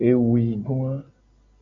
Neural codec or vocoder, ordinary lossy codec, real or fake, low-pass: none; AAC, 64 kbps; real; 7.2 kHz